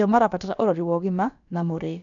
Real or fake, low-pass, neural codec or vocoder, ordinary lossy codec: fake; 7.2 kHz; codec, 16 kHz, about 1 kbps, DyCAST, with the encoder's durations; MP3, 96 kbps